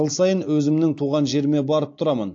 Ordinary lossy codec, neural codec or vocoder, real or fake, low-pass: AAC, 48 kbps; none; real; 7.2 kHz